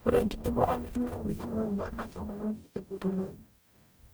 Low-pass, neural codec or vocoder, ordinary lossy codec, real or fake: none; codec, 44.1 kHz, 0.9 kbps, DAC; none; fake